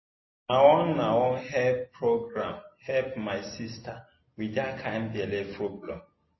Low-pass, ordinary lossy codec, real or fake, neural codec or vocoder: 7.2 kHz; MP3, 24 kbps; real; none